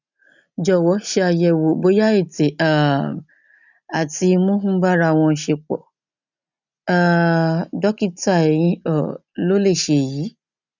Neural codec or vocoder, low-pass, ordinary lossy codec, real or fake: none; 7.2 kHz; none; real